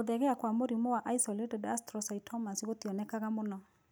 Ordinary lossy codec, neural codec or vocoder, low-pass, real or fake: none; none; none; real